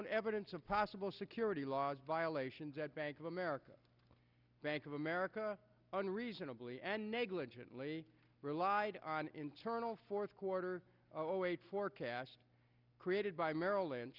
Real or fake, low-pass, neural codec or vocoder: real; 5.4 kHz; none